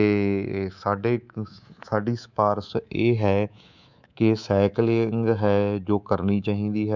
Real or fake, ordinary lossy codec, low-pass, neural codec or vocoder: fake; none; 7.2 kHz; codec, 24 kHz, 3.1 kbps, DualCodec